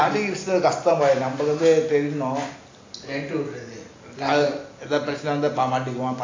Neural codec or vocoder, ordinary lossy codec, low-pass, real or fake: none; AAC, 32 kbps; 7.2 kHz; real